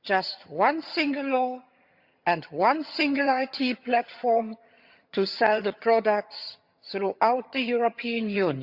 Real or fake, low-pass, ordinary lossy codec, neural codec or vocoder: fake; 5.4 kHz; Opus, 64 kbps; vocoder, 22.05 kHz, 80 mel bands, HiFi-GAN